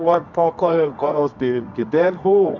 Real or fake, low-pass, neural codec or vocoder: fake; 7.2 kHz; codec, 24 kHz, 0.9 kbps, WavTokenizer, medium music audio release